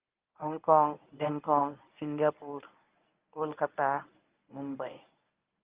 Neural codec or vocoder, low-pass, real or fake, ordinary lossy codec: codec, 24 kHz, 0.9 kbps, WavTokenizer, medium speech release version 1; 3.6 kHz; fake; Opus, 24 kbps